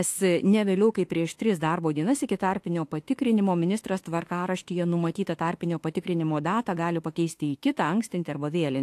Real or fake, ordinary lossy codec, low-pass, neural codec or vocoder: fake; AAC, 64 kbps; 14.4 kHz; autoencoder, 48 kHz, 32 numbers a frame, DAC-VAE, trained on Japanese speech